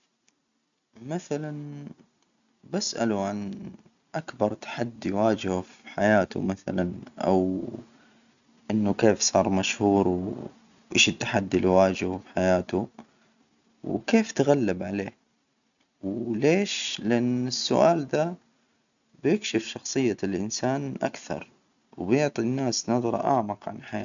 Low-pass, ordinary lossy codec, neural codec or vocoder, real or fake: 7.2 kHz; MP3, 96 kbps; none; real